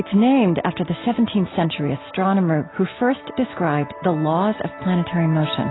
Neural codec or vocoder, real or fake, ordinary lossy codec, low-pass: none; real; AAC, 16 kbps; 7.2 kHz